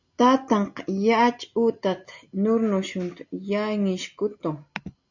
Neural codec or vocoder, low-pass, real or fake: none; 7.2 kHz; real